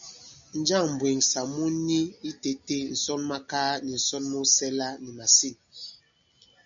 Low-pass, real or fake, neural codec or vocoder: 7.2 kHz; real; none